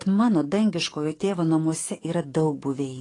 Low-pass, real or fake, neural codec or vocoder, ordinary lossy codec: 10.8 kHz; fake; codec, 44.1 kHz, 7.8 kbps, DAC; AAC, 32 kbps